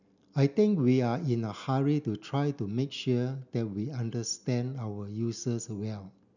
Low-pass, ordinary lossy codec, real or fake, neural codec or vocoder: 7.2 kHz; none; real; none